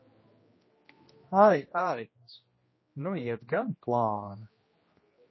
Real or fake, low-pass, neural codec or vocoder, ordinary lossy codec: fake; 7.2 kHz; codec, 16 kHz, 1 kbps, X-Codec, HuBERT features, trained on general audio; MP3, 24 kbps